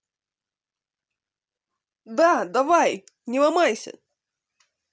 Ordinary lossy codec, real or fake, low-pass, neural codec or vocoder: none; real; none; none